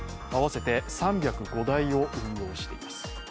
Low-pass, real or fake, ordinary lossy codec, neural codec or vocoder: none; real; none; none